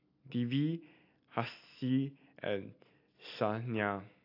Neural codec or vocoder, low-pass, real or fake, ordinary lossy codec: none; 5.4 kHz; real; AAC, 32 kbps